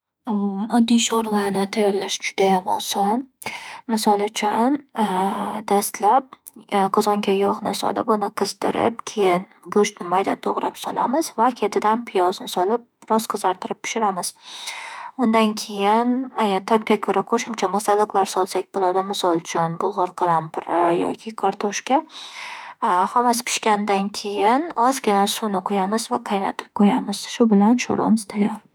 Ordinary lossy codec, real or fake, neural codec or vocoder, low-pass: none; fake; autoencoder, 48 kHz, 32 numbers a frame, DAC-VAE, trained on Japanese speech; none